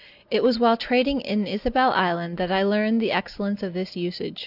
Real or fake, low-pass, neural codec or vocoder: real; 5.4 kHz; none